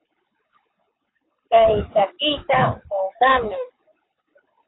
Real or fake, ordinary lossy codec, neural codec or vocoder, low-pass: fake; AAC, 16 kbps; codec, 24 kHz, 6 kbps, HILCodec; 7.2 kHz